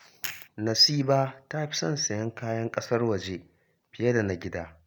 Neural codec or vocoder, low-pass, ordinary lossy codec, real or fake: none; none; none; real